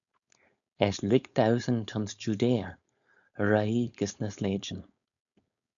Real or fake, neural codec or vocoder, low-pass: fake; codec, 16 kHz, 4.8 kbps, FACodec; 7.2 kHz